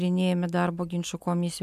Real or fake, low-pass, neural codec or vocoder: real; 14.4 kHz; none